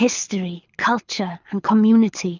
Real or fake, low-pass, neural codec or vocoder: fake; 7.2 kHz; codec, 24 kHz, 6 kbps, HILCodec